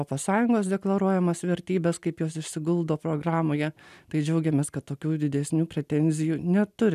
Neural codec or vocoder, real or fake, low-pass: none; real; 14.4 kHz